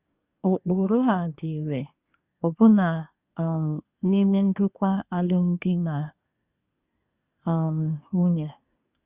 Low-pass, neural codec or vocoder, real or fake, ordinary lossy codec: 3.6 kHz; codec, 24 kHz, 1 kbps, SNAC; fake; Opus, 64 kbps